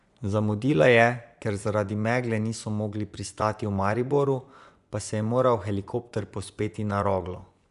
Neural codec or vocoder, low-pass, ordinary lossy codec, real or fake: vocoder, 24 kHz, 100 mel bands, Vocos; 10.8 kHz; none; fake